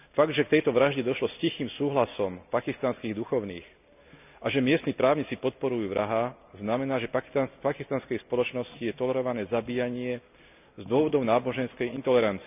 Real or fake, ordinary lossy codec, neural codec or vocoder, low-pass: real; none; none; 3.6 kHz